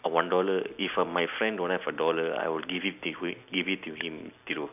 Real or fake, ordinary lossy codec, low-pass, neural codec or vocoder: real; none; 3.6 kHz; none